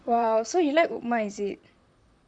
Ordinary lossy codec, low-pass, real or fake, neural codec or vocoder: none; 9.9 kHz; fake; vocoder, 44.1 kHz, 128 mel bands, Pupu-Vocoder